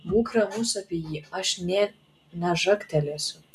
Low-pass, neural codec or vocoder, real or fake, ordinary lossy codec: 14.4 kHz; none; real; MP3, 96 kbps